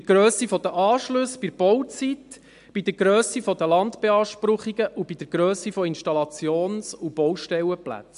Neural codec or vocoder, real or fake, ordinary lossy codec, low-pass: none; real; MP3, 64 kbps; 10.8 kHz